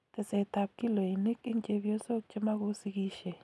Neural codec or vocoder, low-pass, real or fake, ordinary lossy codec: none; none; real; none